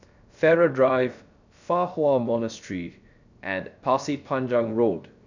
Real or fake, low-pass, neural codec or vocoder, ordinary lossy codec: fake; 7.2 kHz; codec, 16 kHz, 0.3 kbps, FocalCodec; none